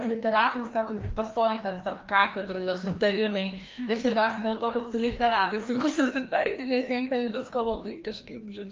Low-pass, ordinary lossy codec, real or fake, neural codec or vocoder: 7.2 kHz; Opus, 24 kbps; fake; codec, 16 kHz, 1 kbps, FreqCodec, larger model